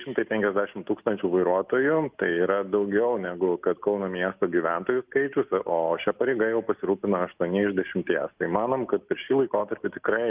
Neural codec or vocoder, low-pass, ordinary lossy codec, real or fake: none; 3.6 kHz; Opus, 32 kbps; real